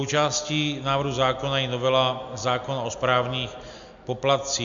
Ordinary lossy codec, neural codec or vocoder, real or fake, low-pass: MP3, 64 kbps; none; real; 7.2 kHz